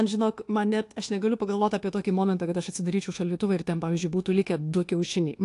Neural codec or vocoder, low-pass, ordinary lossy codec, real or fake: codec, 24 kHz, 1.2 kbps, DualCodec; 10.8 kHz; AAC, 48 kbps; fake